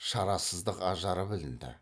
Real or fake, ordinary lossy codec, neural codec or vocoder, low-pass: real; none; none; none